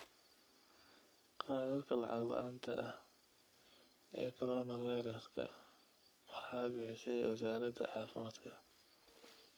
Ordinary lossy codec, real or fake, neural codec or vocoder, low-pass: none; fake; codec, 44.1 kHz, 3.4 kbps, Pupu-Codec; none